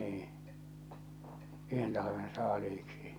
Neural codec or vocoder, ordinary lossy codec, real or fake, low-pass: vocoder, 44.1 kHz, 128 mel bands every 256 samples, BigVGAN v2; none; fake; none